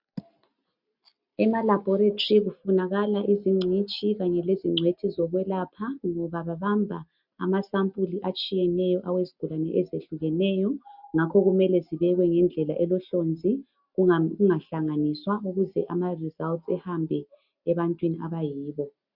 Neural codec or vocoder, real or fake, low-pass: none; real; 5.4 kHz